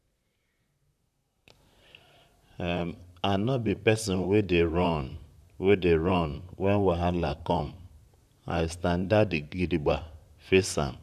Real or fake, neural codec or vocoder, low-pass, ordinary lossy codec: fake; vocoder, 44.1 kHz, 128 mel bands, Pupu-Vocoder; 14.4 kHz; none